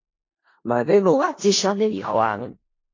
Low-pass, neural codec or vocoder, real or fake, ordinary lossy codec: 7.2 kHz; codec, 16 kHz in and 24 kHz out, 0.4 kbps, LongCat-Audio-Codec, four codebook decoder; fake; AAC, 32 kbps